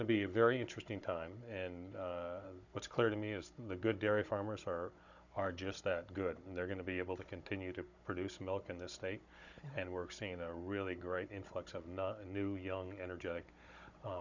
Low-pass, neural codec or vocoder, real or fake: 7.2 kHz; codec, 16 kHz, 16 kbps, FunCodec, trained on Chinese and English, 50 frames a second; fake